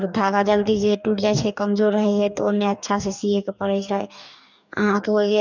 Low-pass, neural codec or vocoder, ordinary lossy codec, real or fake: 7.2 kHz; codec, 44.1 kHz, 2.6 kbps, DAC; none; fake